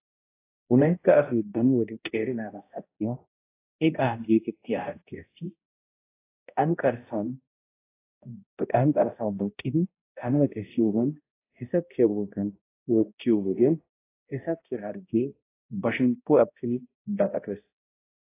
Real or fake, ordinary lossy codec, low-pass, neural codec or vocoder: fake; AAC, 24 kbps; 3.6 kHz; codec, 16 kHz, 0.5 kbps, X-Codec, HuBERT features, trained on balanced general audio